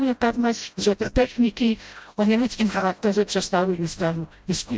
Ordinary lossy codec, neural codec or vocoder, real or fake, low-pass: none; codec, 16 kHz, 0.5 kbps, FreqCodec, smaller model; fake; none